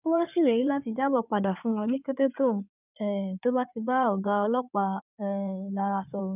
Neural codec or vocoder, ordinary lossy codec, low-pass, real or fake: codec, 16 kHz in and 24 kHz out, 2.2 kbps, FireRedTTS-2 codec; none; 3.6 kHz; fake